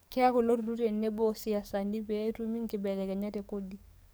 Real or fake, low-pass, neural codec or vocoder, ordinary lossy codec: fake; none; codec, 44.1 kHz, 7.8 kbps, DAC; none